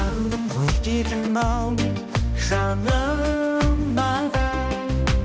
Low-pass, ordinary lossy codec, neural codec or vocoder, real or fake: none; none; codec, 16 kHz, 1 kbps, X-Codec, HuBERT features, trained on balanced general audio; fake